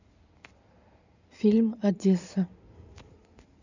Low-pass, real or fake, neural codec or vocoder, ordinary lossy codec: 7.2 kHz; fake; codec, 16 kHz in and 24 kHz out, 2.2 kbps, FireRedTTS-2 codec; none